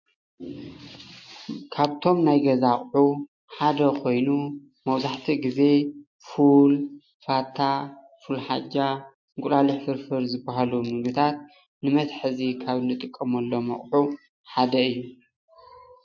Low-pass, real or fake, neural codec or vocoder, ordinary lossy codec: 7.2 kHz; real; none; MP3, 48 kbps